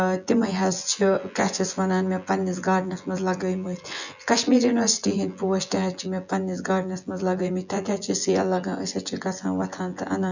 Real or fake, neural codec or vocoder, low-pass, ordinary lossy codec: fake; vocoder, 24 kHz, 100 mel bands, Vocos; 7.2 kHz; none